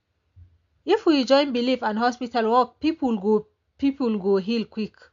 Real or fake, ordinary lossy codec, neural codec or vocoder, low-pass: real; MP3, 64 kbps; none; 7.2 kHz